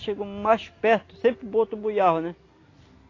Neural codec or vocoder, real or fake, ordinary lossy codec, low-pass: none; real; AAC, 32 kbps; 7.2 kHz